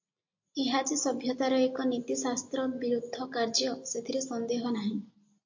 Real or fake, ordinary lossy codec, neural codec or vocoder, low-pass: real; AAC, 48 kbps; none; 7.2 kHz